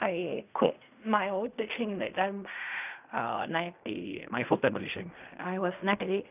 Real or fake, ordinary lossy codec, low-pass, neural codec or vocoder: fake; none; 3.6 kHz; codec, 16 kHz in and 24 kHz out, 0.4 kbps, LongCat-Audio-Codec, fine tuned four codebook decoder